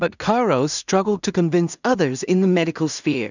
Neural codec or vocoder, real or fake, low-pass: codec, 16 kHz in and 24 kHz out, 0.4 kbps, LongCat-Audio-Codec, two codebook decoder; fake; 7.2 kHz